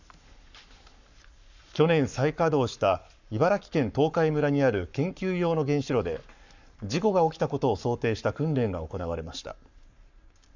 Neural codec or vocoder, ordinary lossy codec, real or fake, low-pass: codec, 44.1 kHz, 7.8 kbps, Pupu-Codec; none; fake; 7.2 kHz